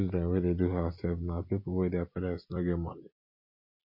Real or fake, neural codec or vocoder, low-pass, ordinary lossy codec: real; none; 5.4 kHz; MP3, 32 kbps